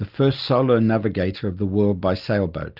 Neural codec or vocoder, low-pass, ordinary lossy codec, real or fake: none; 5.4 kHz; Opus, 24 kbps; real